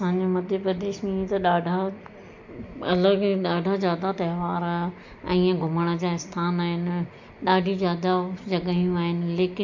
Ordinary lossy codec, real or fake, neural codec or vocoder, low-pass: MP3, 48 kbps; real; none; 7.2 kHz